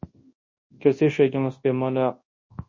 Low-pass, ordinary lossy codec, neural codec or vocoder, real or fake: 7.2 kHz; MP3, 32 kbps; codec, 24 kHz, 0.9 kbps, WavTokenizer, large speech release; fake